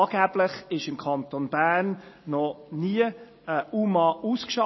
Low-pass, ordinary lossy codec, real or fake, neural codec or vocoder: 7.2 kHz; MP3, 24 kbps; real; none